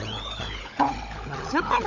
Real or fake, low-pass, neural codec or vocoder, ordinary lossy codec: fake; 7.2 kHz; codec, 16 kHz, 16 kbps, FunCodec, trained on LibriTTS, 50 frames a second; none